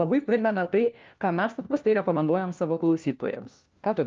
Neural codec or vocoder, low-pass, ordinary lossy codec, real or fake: codec, 16 kHz, 1 kbps, FunCodec, trained on LibriTTS, 50 frames a second; 7.2 kHz; Opus, 24 kbps; fake